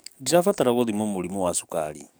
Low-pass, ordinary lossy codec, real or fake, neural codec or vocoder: none; none; fake; codec, 44.1 kHz, 7.8 kbps, DAC